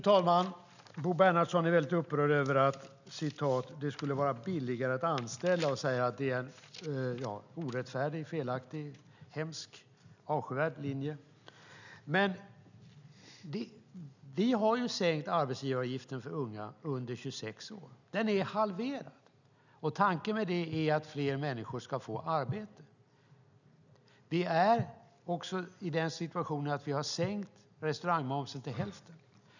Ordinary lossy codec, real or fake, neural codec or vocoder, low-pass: none; real; none; 7.2 kHz